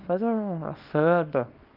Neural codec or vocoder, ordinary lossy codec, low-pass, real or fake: codec, 24 kHz, 0.9 kbps, WavTokenizer, small release; Opus, 32 kbps; 5.4 kHz; fake